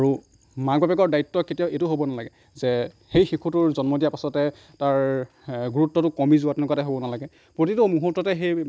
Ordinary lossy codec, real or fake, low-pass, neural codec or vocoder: none; real; none; none